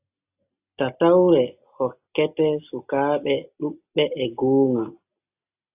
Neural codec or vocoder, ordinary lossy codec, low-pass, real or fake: none; AAC, 32 kbps; 3.6 kHz; real